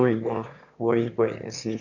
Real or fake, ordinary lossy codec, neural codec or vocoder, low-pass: fake; none; autoencoder, 22.05 kHz, a latent of 192 numbers a frame, VITS, trained on one speaker; 7.2 kHz